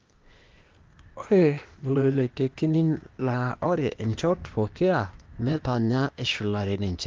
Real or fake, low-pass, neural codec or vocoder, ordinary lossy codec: fake; 7.2 kHz; codec, 16 kHz, 0.8 kbps, ZipCodec; Opus, 32 kbps